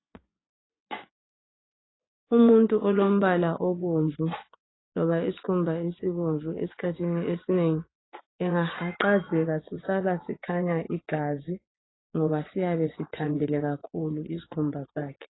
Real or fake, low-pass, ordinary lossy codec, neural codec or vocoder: fake; 7.2 kHz; AAC, 16 kbps; vocoder, 24 kHz, 100 mel bands, Vocos